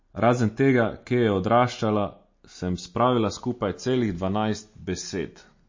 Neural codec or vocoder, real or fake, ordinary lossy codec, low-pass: none; real; MP3, 32 kbps; 7.2 kHz